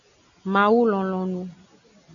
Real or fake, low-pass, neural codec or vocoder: real; 7.2 kHz; none